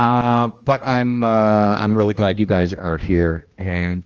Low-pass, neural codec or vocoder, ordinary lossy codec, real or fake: 7.2 kHz; codec, 16 kHz, 1 kbps, X-Codec, HuBERT features, trained on general audio; Opus, 24 kbps; fake